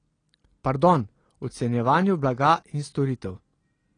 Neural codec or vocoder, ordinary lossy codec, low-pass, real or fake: none; AAC, 32 kbps; 9.9 kHz; real